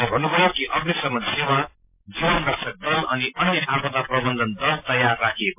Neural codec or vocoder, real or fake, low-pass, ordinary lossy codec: none; real; 3.6 kHz; MP3, 32 kbps